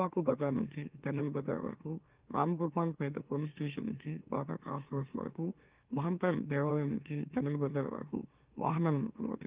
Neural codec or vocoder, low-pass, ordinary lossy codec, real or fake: autoencoder, 44.1 kHz, a latent of 192 numbers a frame, MeloTTS; 3.6 kHz; Opus, 32 kbps; fake